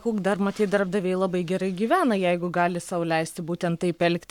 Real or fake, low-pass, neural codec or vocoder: fake; 19.8 kHz; codec, 44.1 kHz, 7.8 kbps, Pupu-Codec